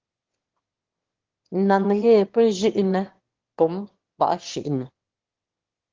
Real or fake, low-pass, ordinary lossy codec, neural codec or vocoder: fake; 7.2 kHz; Opus, 16 kbps; autoencoder, 22.05 kHz, a latent of 192 numbers a frame, VITS, trained on one speaker